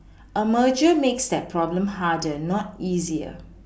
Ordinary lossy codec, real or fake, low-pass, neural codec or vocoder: none; real; none; none